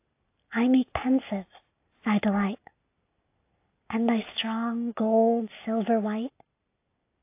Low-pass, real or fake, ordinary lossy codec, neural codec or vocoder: 3.6 kHz; real; AAC, 24 kbps; none